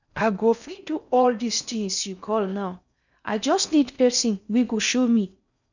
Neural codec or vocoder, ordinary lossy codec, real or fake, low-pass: codec, 16 kHz in and 24 kHz out, 0.6 kbps, FocalCodec, streaming, 4096 codes; none; fake; 7.2 kHz